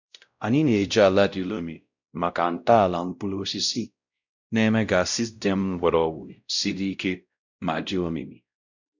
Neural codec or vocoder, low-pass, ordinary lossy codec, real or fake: codec, 16 kHz, 0.5 kbps, X-Codec, WavLM features, trained on Multilingual LibriSpeech; 7.2 kHz; none; fake